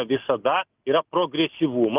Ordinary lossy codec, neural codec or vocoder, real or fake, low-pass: Opus, 32 kbps; none; real; 3.6 kHz